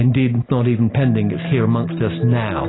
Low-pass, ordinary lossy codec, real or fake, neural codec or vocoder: 7.2 kHz; AAC, 16 kbps; real; none